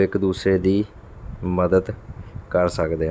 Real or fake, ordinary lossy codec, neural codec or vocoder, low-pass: real; none; none; none